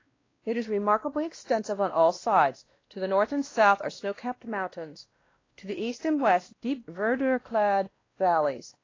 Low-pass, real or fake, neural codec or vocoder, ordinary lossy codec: 7.2 kHz; fake; codec, 16 kHz, 1 kbps, X-Codec, WavLM features, trained on Multilingual LibriSpeech; AAC, 32 kbps